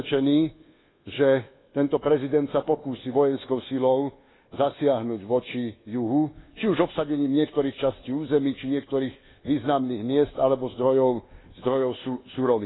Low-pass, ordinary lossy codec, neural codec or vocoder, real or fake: 7.2 kHz; AAC, 16 kbps; codec, 24 kHz, 1.2 kbps, DualCodec; fake